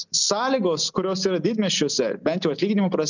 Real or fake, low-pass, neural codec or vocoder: real; 7.2 kHz; none